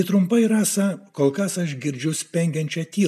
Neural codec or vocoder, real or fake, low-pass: none; real; 14.4 kHz